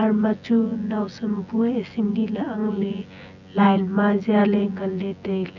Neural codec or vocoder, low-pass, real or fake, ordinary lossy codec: vocoder, 24 kHz, 100 mel bands, Vocos; 7.2 kHz; fake; none